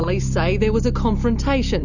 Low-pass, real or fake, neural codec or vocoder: 7.2 kHz; real; none